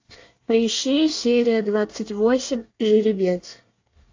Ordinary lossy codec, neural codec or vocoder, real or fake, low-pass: AAC, 48 kbps; codec, 24 kHz, 1 kbps, SNAC; fake; 7.2 kHz